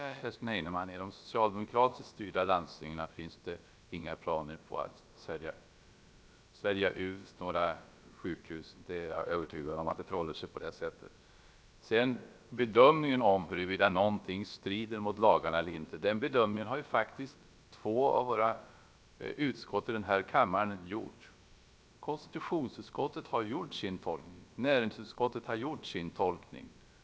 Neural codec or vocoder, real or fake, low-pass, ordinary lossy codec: codec, 16 kHz, about 1 kbps, DyCAST, with the encoder's durations; fake; none; none